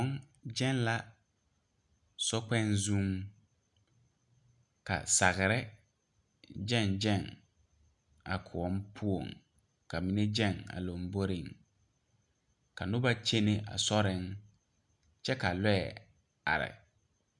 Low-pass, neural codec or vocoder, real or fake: 10.8 kHz; none; real